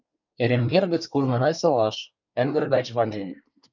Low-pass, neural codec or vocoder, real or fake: 7.2 kHz; codec, 24 kHz, 1 kbps, SNAC; fake